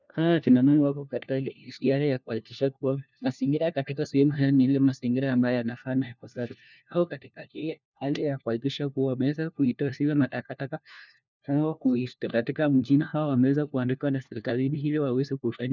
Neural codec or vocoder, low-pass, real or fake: codec, 16 kHz, 1 kbps, FunCodec, trained on LibriTTS, 50 frames a second; 7.2 kHz; fake